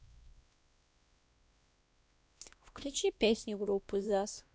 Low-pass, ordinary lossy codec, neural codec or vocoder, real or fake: none; none; codec, 16 kHz, 1 kbps, X-Codec, WavLM features, trained on Multilingual LibriSpeech; fake